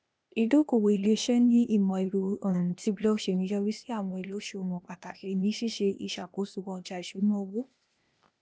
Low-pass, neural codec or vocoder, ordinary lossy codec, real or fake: none; codec, 16 kHz, 0.8 kbps, ZipCodec; none; fake